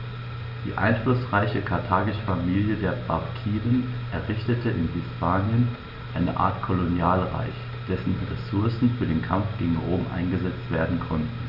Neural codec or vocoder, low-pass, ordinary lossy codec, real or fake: none; 5.4 kHz; Opus, 64 kbps; real